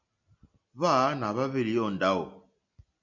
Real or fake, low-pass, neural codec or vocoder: real; 7.2 kHz; none